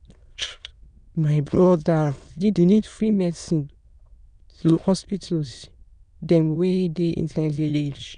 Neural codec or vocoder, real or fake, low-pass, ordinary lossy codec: autoencoder, 22.05 kHz, a latent of 192 numbers a frame, VITS, trained on many speakers; fake; 9.9 kHz; none